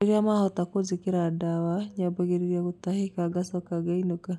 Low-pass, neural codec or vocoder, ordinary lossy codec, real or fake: none; none; none; real